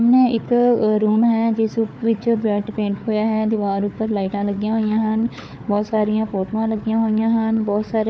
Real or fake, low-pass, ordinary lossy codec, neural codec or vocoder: fake; none; none; codec, 16 kHz, 4 kbps, FunCodec, trained on Chinese and English, 50 frames a second